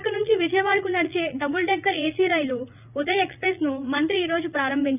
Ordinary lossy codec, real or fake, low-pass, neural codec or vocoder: none; fake; 3.6 kHz; vocoder, 44.1 kHz, 80 mel bands, Vocos